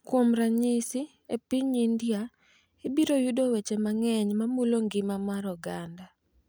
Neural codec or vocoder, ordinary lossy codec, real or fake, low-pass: none; none; real; none